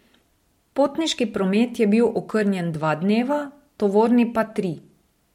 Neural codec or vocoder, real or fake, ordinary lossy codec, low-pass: none; real; MP3, 64 kbps; 19.8 kHz